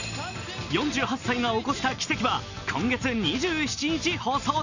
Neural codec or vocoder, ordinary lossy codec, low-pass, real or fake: none; none; 7.2 kHz; real